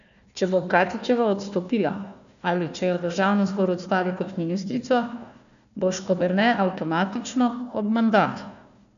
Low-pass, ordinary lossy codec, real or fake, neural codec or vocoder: 7.2 kHz; none; fake; codec, 16 kHz, 1 kbps, FunCodec, trained on Chinese and English, 50 frames a second